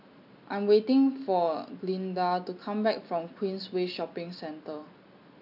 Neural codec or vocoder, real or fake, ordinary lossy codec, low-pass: none; real; AAC, 48 kbps; 5.4 kHz